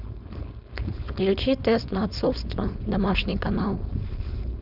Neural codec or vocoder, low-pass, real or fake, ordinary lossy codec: codec, 16 kHz, 4.8 kbps, FACodec; 5.4 kHz; fake; none